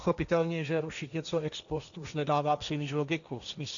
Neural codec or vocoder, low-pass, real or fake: codec, 16 kHz, 1.1 kbps, Voila-Tokenizer; 7.2 kHz; fake